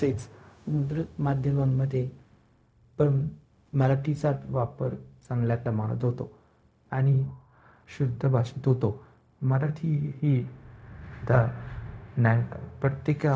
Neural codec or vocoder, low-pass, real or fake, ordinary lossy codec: codec, 16 kHz, 0.4 kbps, LongCat-Audio-Codec; none; fake; none